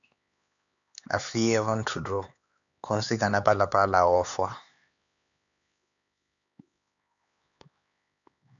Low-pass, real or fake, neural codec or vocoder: 7.2 kHz; fake; codec, 16 kHz, 4 kbps, X-Codec, HuBERT features, trained on LibriSpeech